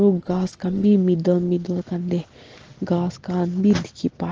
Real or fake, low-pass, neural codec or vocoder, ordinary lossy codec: real; 7.2 kHz; none; Opus, 16 kbps